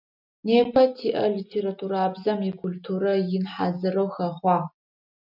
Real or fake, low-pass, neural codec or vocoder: real; 5.4 kHz; none